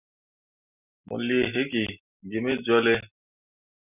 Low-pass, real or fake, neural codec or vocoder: 3.6 kHz; real; none